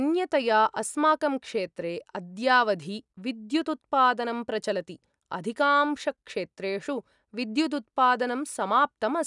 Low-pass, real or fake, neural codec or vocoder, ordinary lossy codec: 10.8 kHz; real; none; none